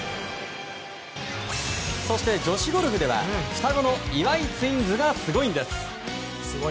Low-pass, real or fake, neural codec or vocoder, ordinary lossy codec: none; real; none; none